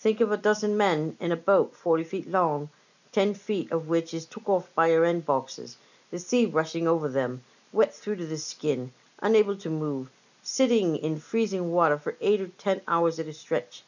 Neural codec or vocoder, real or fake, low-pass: none; real; 7.2 kHz